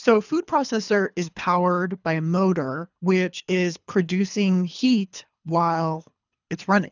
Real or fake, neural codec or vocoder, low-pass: fake; codec, 24 kHz, 3 kbps, HILCodec; 7.2 kHz